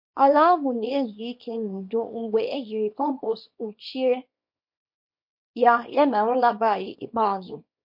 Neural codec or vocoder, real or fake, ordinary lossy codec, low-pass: codec, 24 kHz, 0.9 kbps, WavTokenizer, small release; fake; MP3, 32 kbps; 5.4 kHz